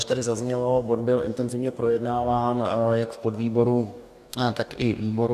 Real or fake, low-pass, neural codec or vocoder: fake; 14.4 kHz; codec, 44.1 kHz, 2.6 kbps, DAC